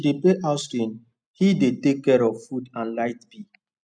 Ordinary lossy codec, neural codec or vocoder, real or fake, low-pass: none; none; real; 9.9 kHz